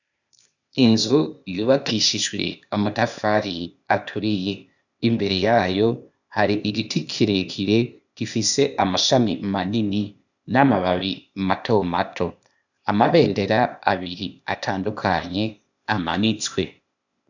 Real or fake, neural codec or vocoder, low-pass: fake; codec, 16 kHz, 0.8 kbps, ZipCodec; 7.2 kHz